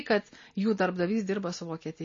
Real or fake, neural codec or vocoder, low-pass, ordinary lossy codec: real; none; 7.2 kHz; MP3, 32 kbps